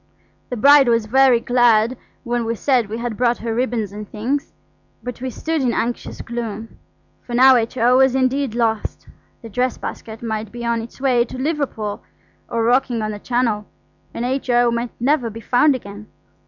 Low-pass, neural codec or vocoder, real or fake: 7.2 kHz; vocoder, 44.1 kHz, 128 mel bands every 256 samples, BigVGAN v2; fake